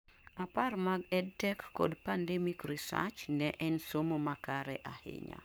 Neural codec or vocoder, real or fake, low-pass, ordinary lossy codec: codec, 44.1 kHz, 7.8 kbps, Pupu-Codec; fake; none; none